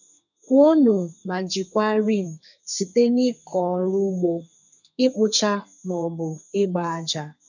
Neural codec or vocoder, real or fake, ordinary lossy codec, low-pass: codec, 32 kHz, 1.9 kbps, SNAC; fake; none; 7.2 kHz